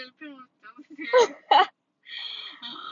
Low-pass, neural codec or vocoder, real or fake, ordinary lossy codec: 7.2 kHz; none; real; MP3, 48 kbps